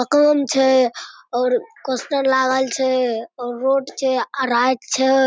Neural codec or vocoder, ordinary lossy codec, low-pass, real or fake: none; none; none; real